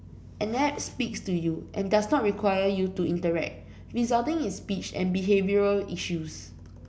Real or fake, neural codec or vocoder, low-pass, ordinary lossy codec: real; none; none; none